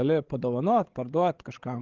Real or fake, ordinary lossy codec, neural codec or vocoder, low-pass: fake; Opus, 16 kbps; codec, 16 kHz, 16 kbps, FreqCodec, larger model; 7.2 kHz